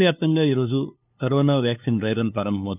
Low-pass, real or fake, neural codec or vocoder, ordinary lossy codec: 3.6 kHz; fake; codec, 16 kHz, 2 kbps, FunCodec, trained on LibriTTS, 25 frames a second; none